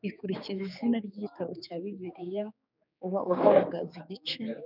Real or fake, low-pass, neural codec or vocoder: fake; 5.4 kHz; codec, 16 kHz, 4 kbps, X-Codec, HuBERT features, trained on general audio